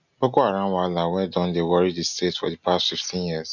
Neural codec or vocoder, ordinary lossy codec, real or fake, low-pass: none; none; real; 7.2 kHz